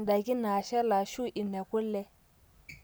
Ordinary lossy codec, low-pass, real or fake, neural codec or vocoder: none; none; real; none